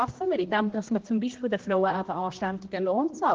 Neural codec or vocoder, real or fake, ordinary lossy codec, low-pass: codec, 16 kHz, 1 kbps, X-Codec, HuBERT features, trained on general audio; fake; Opus, 16 kbps; 7.2 kHz